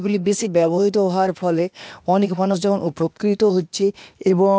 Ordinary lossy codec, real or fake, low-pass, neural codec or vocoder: none; fake; none; codec, 16 kHz, 0.8 kbps, ZipCodec